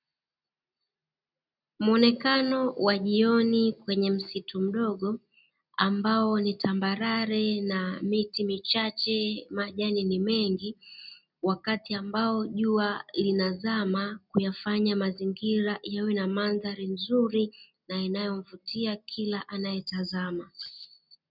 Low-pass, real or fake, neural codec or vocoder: 5.4 kHz; real; none